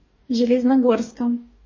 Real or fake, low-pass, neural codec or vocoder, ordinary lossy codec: fake; 7.2 kHz; codec, 44.1 kHz, 2.6 kbps, DAC; MP3, 32 kbps